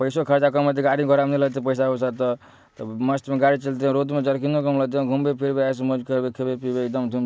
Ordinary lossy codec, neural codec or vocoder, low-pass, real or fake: none; none; none; real